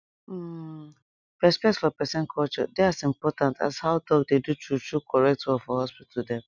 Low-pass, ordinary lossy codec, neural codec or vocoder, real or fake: 7.2 kHz; none; none; real